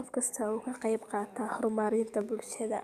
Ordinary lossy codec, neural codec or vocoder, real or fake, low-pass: none; codec, 44.1 kHz, 7.8 kbps, DAC; fake; 14.4 kHz